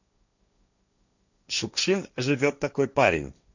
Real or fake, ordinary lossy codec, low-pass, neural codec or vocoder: fake; none; 7.2 kHz; codec, 16 kHz, 1.1 kbps, Voila-Tokenizer